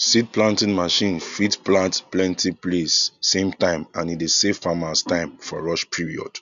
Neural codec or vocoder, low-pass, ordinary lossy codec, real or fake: none; 7.2 kHz; none; real